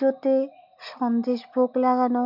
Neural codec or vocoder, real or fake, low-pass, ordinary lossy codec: none; real; 5.4 kHz; none